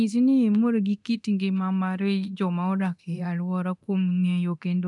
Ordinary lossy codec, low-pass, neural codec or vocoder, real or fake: none; 10.8 kHz; codec, 24 kHz, 0.9 kbps, DualCodec; fake